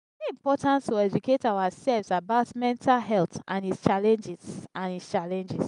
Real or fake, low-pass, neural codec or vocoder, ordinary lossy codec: real; 10.8 kHz; none; none